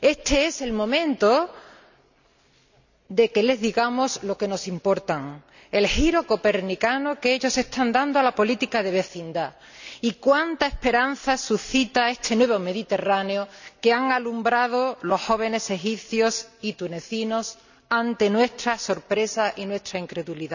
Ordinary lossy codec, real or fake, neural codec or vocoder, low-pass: none; real; none; 7.2 kHz